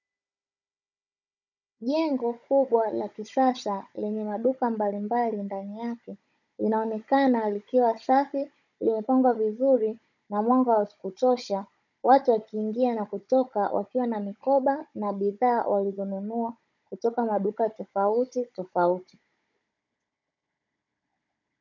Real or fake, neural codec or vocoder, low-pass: fake; codec, 16 kHz, 16 kbps, FunCodec, trained on Chinese and English, 50 frames a second; 7.2 kHz